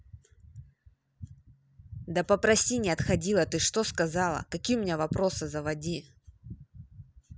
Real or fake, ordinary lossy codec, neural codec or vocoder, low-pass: real; none; none; none